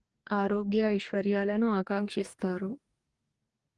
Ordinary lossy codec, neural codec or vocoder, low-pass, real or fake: Opus, 24 kbps; codec, 44.1 kHz, 2.6 kbps, DAC; 10.8 kHz; fake